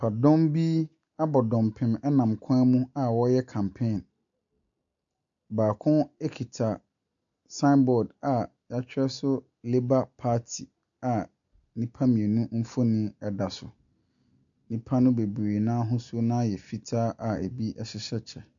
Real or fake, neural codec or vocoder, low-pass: real; none; 7.2 kHz